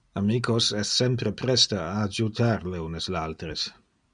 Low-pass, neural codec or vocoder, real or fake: 9.9 kHz; none; real